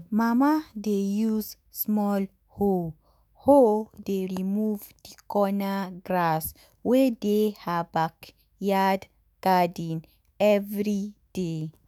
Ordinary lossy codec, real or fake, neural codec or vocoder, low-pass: none; fake; autoencoder, 48 kHz, 128 numbers a frame, DAC-VAE, trained on Japanese speech; none